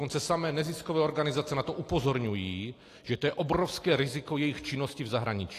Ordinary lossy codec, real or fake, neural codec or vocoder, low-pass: AAC, 48 kbps; real; none; 14.4 kHz